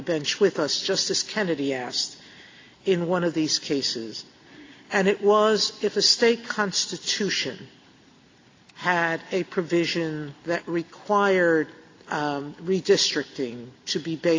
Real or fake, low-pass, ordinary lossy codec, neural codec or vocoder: real; 7.2 kHz; AAC, 32 kbps; none